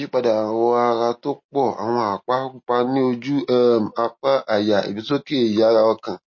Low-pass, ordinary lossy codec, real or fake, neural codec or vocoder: 7.2 kHz; MP3, 32 kbps; real; none